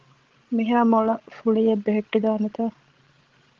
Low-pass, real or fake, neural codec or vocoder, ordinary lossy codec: 7.2 kHz; fake; codec, 16 kHz, 16 kbps, FreqCodec, larger model; Opus, 16 kbps